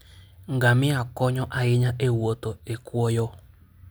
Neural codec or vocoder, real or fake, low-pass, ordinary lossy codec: none; real; none; none